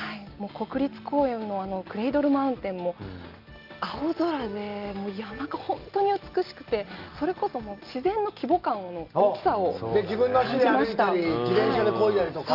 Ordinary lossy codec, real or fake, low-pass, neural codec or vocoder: Opus, 32 kbps; real; 5.4 kHz; none